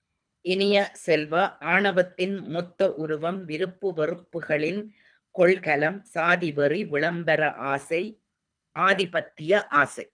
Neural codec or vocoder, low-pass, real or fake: codec, 24 kHz, 3 kbps, HILCodec; 9.9 kHz; fake